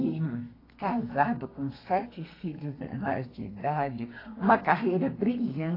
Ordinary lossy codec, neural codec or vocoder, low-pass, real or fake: AAC, 24 kbps; codec, 32 kHz, 1.9 kbps, SNAC; 5.4 kHz; fake